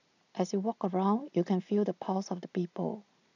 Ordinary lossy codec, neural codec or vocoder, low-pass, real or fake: none; none; 7.2 kHz; real